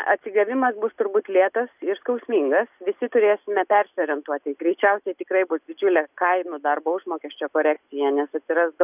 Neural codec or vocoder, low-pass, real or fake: none; 3.6 kHz; real